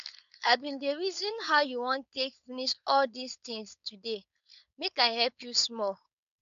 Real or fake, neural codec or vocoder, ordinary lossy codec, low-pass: fake; codec, 16 kHz, 4.8 kbps, FACodec; none; 7.2 kHz